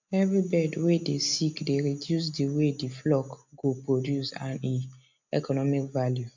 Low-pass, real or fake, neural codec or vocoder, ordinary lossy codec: 7.2 kHz; real; none; MP3, 64 kbps